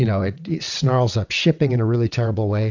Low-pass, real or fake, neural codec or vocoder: 7.2 kHz; fake; vocoder, 22.05 kHz, 80 mel bands, WaveNeXt